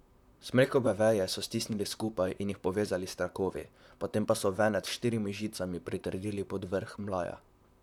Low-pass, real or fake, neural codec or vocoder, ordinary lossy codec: 19.8 kHz; fake; vocoder, 44.1 kHz, 128 mel bands, Pupu-Vocoder; none